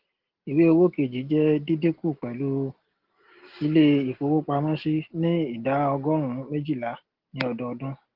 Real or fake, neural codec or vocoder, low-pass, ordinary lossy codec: real; none; 5.4 kHz; Opus, 16 kbps